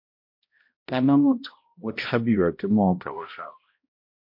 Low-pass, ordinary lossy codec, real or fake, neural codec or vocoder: 5.4 kHz; MP3, 32 kbps; fake; codec, 16 kHz, 0.5 kbps, X-Codec, HuBERT features, trained on balanced general audio